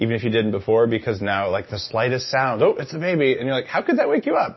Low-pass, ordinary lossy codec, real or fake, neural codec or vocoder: 7.2 kHz; MP3, 24 kbps; real; none